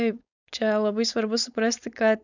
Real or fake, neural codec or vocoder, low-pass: fake; codec, 16 kHz, 4.8 kbps, FACodec; 7.2 kHz